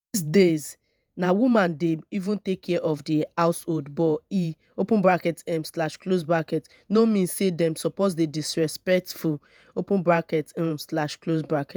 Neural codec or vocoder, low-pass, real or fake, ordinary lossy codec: vocoder, 48 kHz, 128 mel bands, Vocos; none; fake; none